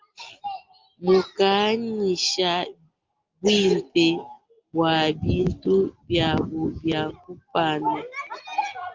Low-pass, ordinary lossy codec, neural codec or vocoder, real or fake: 7.2 kHz; Opus, 24 kbps; none; real